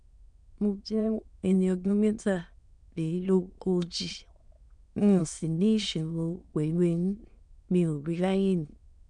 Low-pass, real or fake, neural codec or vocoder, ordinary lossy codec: 9.9 kHz; fake; autoencoder, 22.05 kHz, a latent of 192 numbers a frame, VITS, trained on many speakers; none